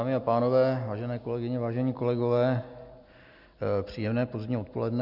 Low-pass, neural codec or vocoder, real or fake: 5.4 kHz; none; real